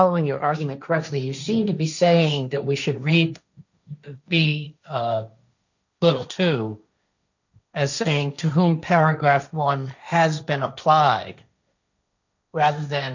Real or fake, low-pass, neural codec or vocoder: fake; 7.2 kHz; codec, 16 kHz, 1.1 kbps, Voila-Tokenizer